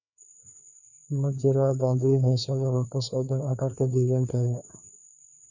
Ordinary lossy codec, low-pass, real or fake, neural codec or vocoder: none; 7.2 kHz; fake; codec, 16 kHz, 2 kbps, FreqCodec, larger model